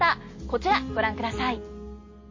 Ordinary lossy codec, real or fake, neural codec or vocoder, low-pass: MP3, 32 kbps; real; none; 7.2 kHz